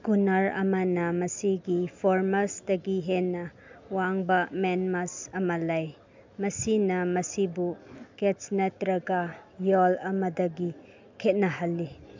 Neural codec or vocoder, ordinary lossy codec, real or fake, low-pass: none; MP3, 64 kbps; real; 7.2 kHz